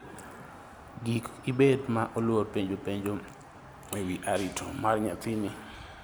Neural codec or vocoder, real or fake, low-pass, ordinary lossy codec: none; real; none; none